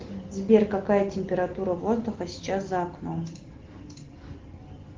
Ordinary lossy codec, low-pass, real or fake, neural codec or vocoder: Opus, 16 kbps; 7.2 kHz; real; none